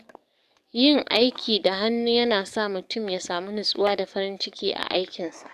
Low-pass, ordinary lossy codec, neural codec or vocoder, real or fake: 14.4 kHz; none; codec, 44.1 kHz, 7.8 kbps, DAC; fake